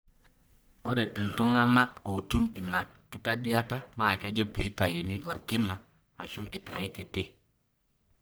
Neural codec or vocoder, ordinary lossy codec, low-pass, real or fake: codec, 44.1 kHz, 1.7 kbps, Pupu-Codec; none; none; fake